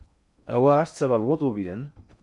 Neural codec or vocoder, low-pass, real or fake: codec, 16 kHz in and 24 kHz out, 0.6 kbps, FocalCodec, streaming, 2048 codes; 10.8 kHz; fake